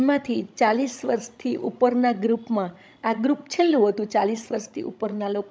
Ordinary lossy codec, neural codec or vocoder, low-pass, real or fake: none; codec, 16 kHz, 16 kbps, FreqCodec, larger model; none; fake